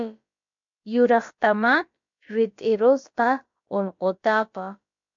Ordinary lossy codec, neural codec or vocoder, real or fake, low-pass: MP3, 48 kbps; codec, 16 kHz, about 1 kbps, DyCAST, with the encoder's durations; fake; 7.2 kHz